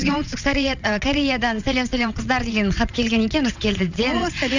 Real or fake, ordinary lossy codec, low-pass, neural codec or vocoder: fake; none; 7.2 kHz; vocoder, 22.05 kHz, 80 mel bands, WaveNeXt